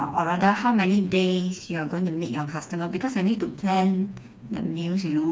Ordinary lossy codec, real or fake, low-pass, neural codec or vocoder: none; fake; none; codec, 16 kHz, 2 kbps, FreqCodec, smaller model